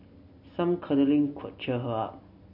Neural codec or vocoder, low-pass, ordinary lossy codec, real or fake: none; 5.4 kHz; MP3, 32 kbps; real